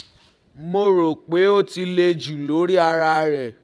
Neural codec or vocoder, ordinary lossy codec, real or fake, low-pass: vocoder, 22.05 kHz, 80 mel bands, WaveNeXt; none; fake; none